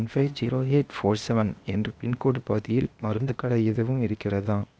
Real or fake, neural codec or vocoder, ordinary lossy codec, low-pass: fake; codec, 16 kHz, 0.8 kbps, ZipCodec; none; none